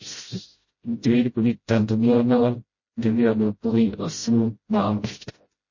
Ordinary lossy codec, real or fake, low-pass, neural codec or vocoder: MP3, 32 kbps; fake; 7.2 kHz; codec, 16 kHz, 0.5 kbps, FreqCodec, smaller model